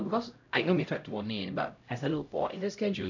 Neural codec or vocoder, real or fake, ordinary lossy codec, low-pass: codec, 16 kHz, 0.5 kbps, X-Codec, HuBERT features, trained on LibriSpeech; fake; none; 7.2 kHz